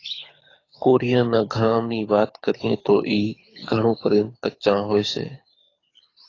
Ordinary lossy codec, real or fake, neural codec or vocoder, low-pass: AAC, 32 kbps; fake; codec, 24 kHz, 6 kbps, HILCodec; 7.2 kHz